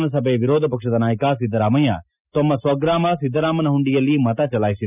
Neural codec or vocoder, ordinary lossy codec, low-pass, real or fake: none; none; 3.6 kHz; real